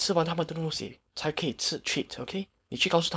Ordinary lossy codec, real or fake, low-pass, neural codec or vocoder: none; fake; none; codec, 16 kHz, 4.8 kbps, FACodec